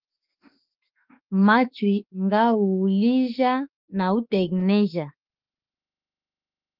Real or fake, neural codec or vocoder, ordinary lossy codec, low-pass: fake; autoencoder, 48 kHz, 32 numbers a frame, DAC-VAE, trained on Japanese speech; Opus, 24 kbps; 5.4 kHz